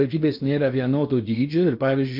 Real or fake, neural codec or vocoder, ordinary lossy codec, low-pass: fake; codec, 16 kHz in and 24 kHz out, 0.8 kbps, FocalCodec, streaming, 65536 codes; MP3, 48 kbps; 5.4 kHz